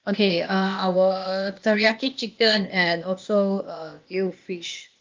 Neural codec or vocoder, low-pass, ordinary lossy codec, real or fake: codec, 16 kHz, 0.8 kbps, ZipCodec; 7.2 kHz; Opus, 24 kbps; fake